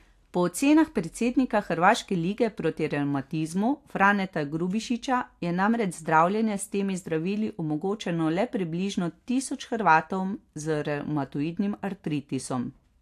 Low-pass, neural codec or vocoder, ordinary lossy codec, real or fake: 14.4 kHz; none; AAC, 64 kbps; real